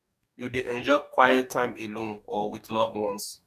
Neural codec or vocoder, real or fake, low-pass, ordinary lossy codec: codec, 44.1 kHz, 2.6 kbps, DAC; fake; 14.4 kHz; none